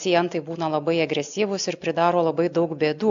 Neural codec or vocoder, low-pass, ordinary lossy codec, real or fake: none; 7.2 kHz; AAC, 64 kbps; real